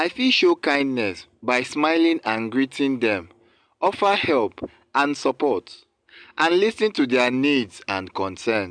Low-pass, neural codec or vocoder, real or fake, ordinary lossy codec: 9.9 kHz; none; real; MP3, 96 kbps